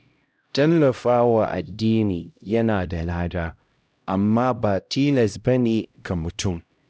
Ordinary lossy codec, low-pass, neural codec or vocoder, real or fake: none; none; codec, 16 kHz, 0.5 kbps, X-Codec, HuBERT features, trained on LibriSpeech; fake